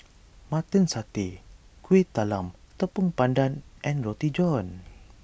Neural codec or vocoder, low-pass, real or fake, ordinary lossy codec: none; none; real; none